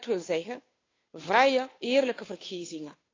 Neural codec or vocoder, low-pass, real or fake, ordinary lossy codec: codec, 16 kHz, 2 kbps, FunCodec, trained on Chinese and English, 25 frames a second; 7.2 kHz; fake; AAC, 32 kbps